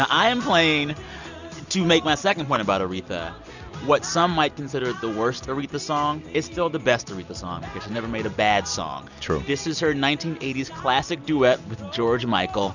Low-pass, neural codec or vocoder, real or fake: 7.2 kHz; none; real